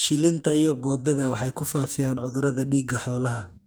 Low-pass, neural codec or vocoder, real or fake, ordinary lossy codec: none; codec, 44.1 kHz, 2.6 kbps, DAC; fake; none